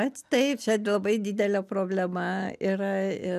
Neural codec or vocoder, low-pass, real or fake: none; 14.4 kHz; real